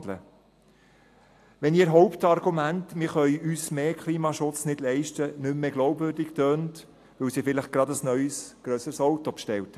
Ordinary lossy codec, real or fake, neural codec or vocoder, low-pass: AAC, 64 kbps; real; none; 14.4 kHz